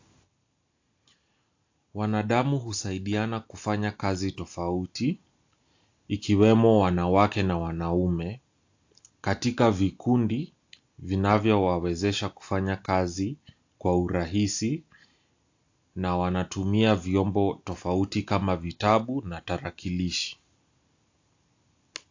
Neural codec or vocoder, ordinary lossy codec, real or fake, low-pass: none; AAC, 48 kbps; real; 7.2 kHz